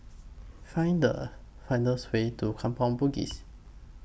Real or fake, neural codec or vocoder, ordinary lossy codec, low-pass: real; none; none; none